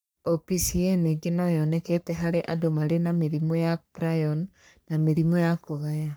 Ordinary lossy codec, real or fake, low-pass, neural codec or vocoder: none; fake; none; codec, 44.1 kHz, 3.4 kbps, Pupu-Codec